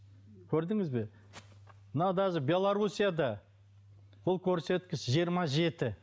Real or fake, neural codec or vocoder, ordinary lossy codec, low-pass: real; none; none; none